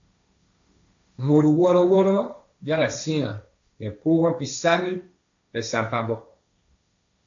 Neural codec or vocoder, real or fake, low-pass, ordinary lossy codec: codec, 16 kHz, 1.1 kbps, Voila-Tokenizer; fake; 7.2 kHz; AAC, 64 kbps